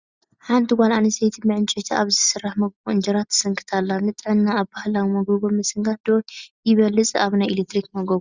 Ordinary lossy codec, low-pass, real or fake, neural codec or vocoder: Opus, 64 kbps; 7.2 kHz; real; none